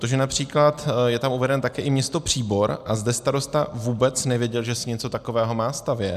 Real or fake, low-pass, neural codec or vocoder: real; 14.4 kHz; none